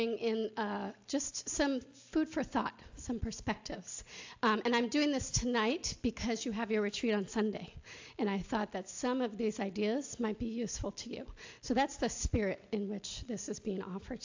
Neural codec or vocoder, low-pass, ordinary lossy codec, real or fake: none; 7.2 kHz; AAC, 48 kbps; real